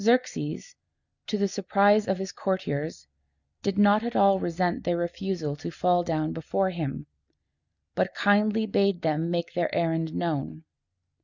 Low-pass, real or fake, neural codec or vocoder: 7.2 kHz; real; none